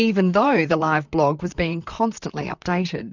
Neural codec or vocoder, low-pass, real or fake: vocoder, 44.1 kHz, 128 mel bands, Pupu-Vocoder; 7.2 kHz; fake